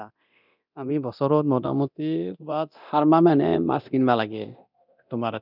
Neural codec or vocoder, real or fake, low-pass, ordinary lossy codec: codec, 24 kHz, 0.9 kbps, DualCodec; fake; 5.4 kHz; none